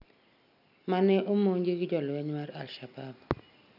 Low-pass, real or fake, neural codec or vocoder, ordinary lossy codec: 5.4 kHz; real; none; none